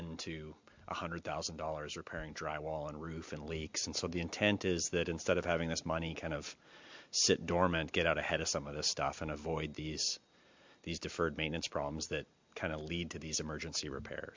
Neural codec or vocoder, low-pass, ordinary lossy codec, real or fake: none; 7.2 kHz; MP3, 64 kbps; real